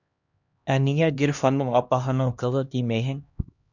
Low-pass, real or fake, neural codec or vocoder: 7.2 kHz; fake; codec, 16 kHz, 1 kbps, X-Codec, HuBERT features, trained on LibriSpeech